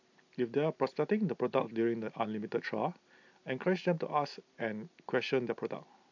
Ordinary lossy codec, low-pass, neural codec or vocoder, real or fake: AAC, 48 kbps; 7.2 kHz; none; real